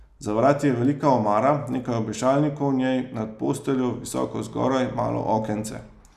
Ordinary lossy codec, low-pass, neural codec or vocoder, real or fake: none; 14.4 kHz; none; real